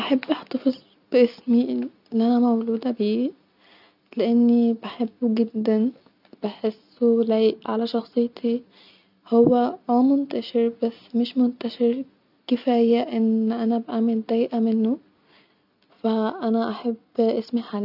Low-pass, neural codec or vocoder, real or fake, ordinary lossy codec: 5.4 kHz; none; real; none